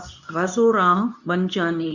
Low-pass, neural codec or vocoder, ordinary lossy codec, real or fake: 7.2 kHz; codec, 24 kHz, 0.9 kbps, WavTokenizer, medium speech release version 2; none; fake